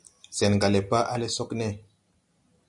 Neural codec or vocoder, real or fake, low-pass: none; real; 10.8 kHz